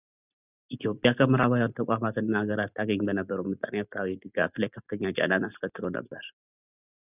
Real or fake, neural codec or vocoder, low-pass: real; none; 3.6 kHz